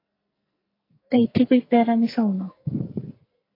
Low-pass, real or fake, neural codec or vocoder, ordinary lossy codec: 5.4 kHz; fake; codec, 44.1 kHz, 2.6 kbps, SNAC; AAC, 32 kbps